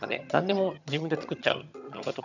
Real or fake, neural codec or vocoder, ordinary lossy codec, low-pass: fake; vocoder, 22.05 kHz, 80 mel bands, HiFi-GAN; none; 7.2 kHz